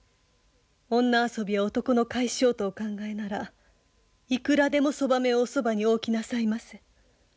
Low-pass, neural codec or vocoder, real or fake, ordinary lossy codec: none; none; real; none